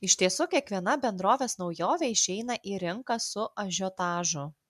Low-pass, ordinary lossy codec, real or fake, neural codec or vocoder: 14.4 kHz; MP3, 96 kbps; real; none